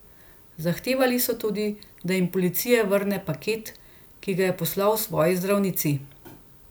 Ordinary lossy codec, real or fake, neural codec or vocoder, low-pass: none; real; none; none